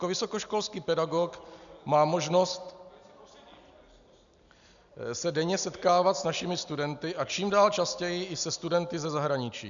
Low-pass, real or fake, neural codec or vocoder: 7.2 kHz; real; none